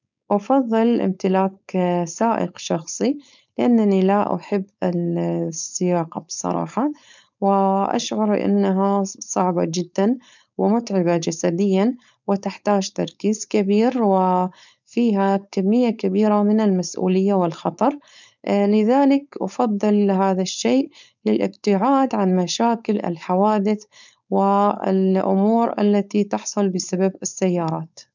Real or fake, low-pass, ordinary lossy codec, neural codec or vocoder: fake; 7.2 kHz; none; codec, 16 kHz, 4.8 kbps, FACodec